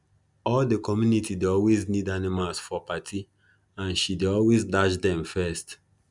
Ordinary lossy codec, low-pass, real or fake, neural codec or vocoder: none; 10.8 kHz; real; none